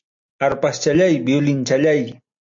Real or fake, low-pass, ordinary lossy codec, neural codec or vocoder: real; 7.2 kHz; AAC, 64 kbps; none